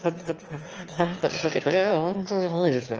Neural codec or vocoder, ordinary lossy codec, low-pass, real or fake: autoencoder, 22.05 kHz, a latent of 192 numbers a frame, VITS, trained on one speaker; Opus, 24 kbps; 7.2 kHz; fake